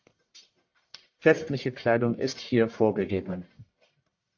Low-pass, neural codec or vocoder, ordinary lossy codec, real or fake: 7.2 kHz; codec, 44.1 kHz, 1.7 kbps, Pupu-Codec; AAC, 48 kbps; fake